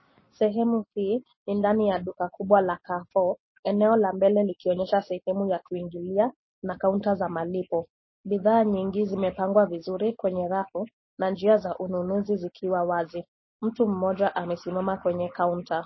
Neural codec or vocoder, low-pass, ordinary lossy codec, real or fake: none; 7.2 kHz; MP3, 24 kbps; real